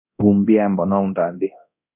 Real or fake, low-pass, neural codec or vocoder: fake; 3.6 kHz; codec, 24 kHz, 0.9 kbps, DualCodec